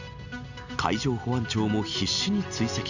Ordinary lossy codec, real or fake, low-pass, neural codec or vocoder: none; real; 7.2 kHz; none